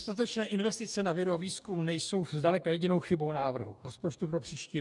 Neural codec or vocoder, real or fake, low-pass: codec, 44.1 kHz, 2.6 kbps, DAC; fake; 10.8 kHz